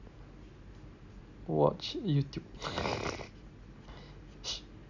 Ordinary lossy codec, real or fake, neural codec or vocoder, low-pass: none; real; none; 7.2 kHz